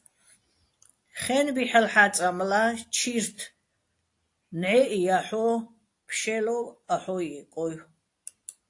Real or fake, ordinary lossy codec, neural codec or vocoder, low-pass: real; AAC, 48 kbps; none; 10.8 kHz